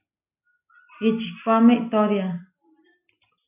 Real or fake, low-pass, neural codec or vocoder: real; 3.6 kHz; none